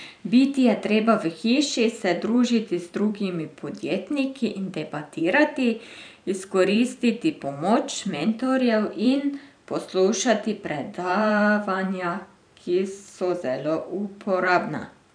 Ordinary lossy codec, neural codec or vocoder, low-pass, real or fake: none; vocoder, 44.1 kHz, 128 mel bands every 512 samples, BigVGAN v2; 9.9 kHz; fake